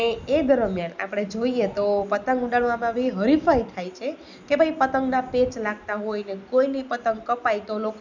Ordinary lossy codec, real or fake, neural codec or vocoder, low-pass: none; real; none; 7.2 kHz